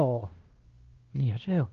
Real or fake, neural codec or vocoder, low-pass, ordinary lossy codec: fake; codec, 16 kHz, 1 kbps, X-Codec, WavLM features, trained on Multilingual LibriSpeech; 7.2 kHz; Opus, 16 kbps